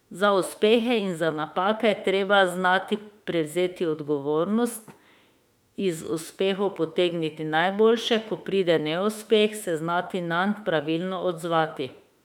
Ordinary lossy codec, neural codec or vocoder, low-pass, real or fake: none; autoencoder, 48 kHz, 32 numbers a frame, DAC-VAE, trained on Japanese speech; 19.8 kHz; fake